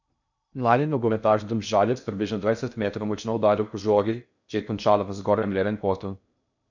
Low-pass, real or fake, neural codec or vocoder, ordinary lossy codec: 7.2 kHz; fake; codec, 16 kHz in and 24 kHz out, 0.6 kbps, FocalCodec, streaming, 2048 codes; none